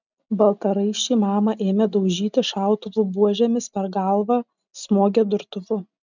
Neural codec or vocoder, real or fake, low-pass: none; real; 7.2 kHz